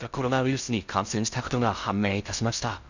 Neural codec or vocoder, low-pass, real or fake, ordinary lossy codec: codec, 16 kHz in and 24 kHz out, 0.6 kbps, FocalCodec, streaming, 2048 codes; 7.2 kHz; fake; none